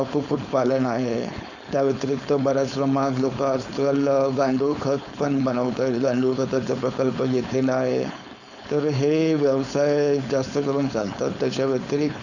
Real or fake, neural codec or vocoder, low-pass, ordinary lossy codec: fake; codec, 16 kHz, 4.8 kbps, FACodec; 7.2 kHz; none